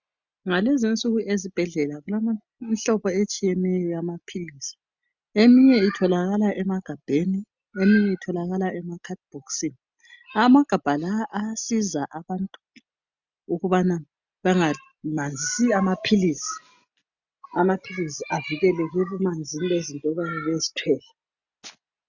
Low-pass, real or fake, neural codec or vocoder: 7.2 kHz; real; none